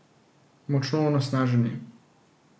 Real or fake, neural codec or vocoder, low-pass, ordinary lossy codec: real; none; none; none